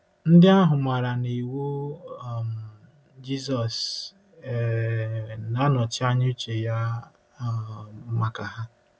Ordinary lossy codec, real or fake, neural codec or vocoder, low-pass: none; real; none; none